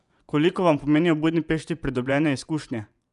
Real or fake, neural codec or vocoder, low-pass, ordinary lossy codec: fake; vocoder, 24 kHz, 100 mel bands, Vocos; 10.8 kHz; none